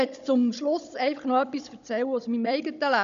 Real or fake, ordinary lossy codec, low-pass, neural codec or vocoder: fake; none; 7.2 kHz; codec, 16 kHz, 16 kbps, FunCodec, trained on Chinese and English, 50 frames a second